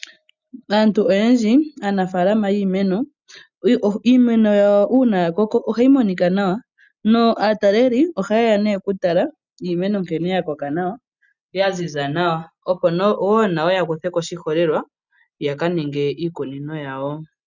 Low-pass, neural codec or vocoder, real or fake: 7.2 kHz; none; real